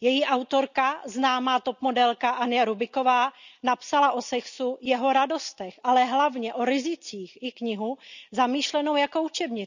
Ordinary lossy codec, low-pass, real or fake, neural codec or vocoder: none; 7.2 kHz; real; none